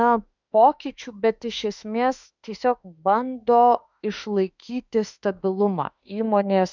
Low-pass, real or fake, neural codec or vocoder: 7.2 kHz; fake; autoencoder, 48 kHz, 32 numbers a frame, DAC-VAE, trained on Japanese speech